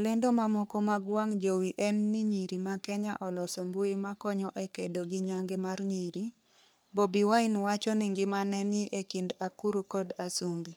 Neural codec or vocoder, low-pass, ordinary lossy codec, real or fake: codec, 44.1 kHz, 3.4 kbps, Pupu-Codec; none; none; fake